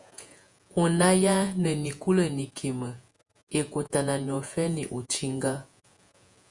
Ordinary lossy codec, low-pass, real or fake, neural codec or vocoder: Opus, 64 kbps; 10.8 kHz; fake; vocoder, 48 kHz, 128 mel bands, Vocos